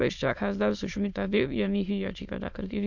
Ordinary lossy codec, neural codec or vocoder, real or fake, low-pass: none; autoencoder, 22.05 kHz, a latent of 192 numbers a frame, VITS, trained on many speakers; fake; 7.2 kHz